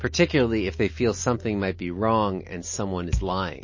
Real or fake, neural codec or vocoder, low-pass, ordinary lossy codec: real; none; 7.2 kHz; MP3, 32 kbps